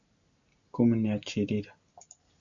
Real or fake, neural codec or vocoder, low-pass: real; none; 7.2 kHz